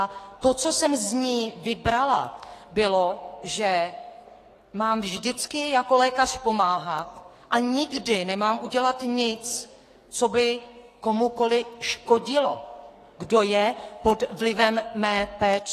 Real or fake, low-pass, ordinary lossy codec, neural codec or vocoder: fake; 14.4 kHz; AAC, 48 kbps; codec, 44.1 kHz, 2.6 kbps, SNAC